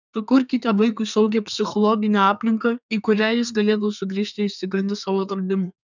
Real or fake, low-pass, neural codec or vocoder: fake; 7.2 kHz; codec, 32 kHz, 1.9 kbps, SNAC